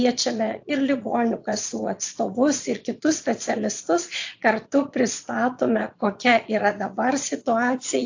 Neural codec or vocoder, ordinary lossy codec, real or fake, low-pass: vocoder, 22.05 kHz, 80 mel bands, Vocos; AAC, 48 kbps; fake; 7.2 kHz